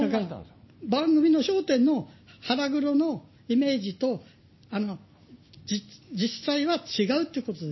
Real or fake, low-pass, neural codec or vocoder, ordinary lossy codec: real; 7.2 kHz; none; MP3, 24 kbps